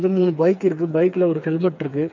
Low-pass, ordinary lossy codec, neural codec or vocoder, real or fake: 7.2 kHz; none; codec, 16 kHz, 4 kbps, FreqCodec, smaller model; fake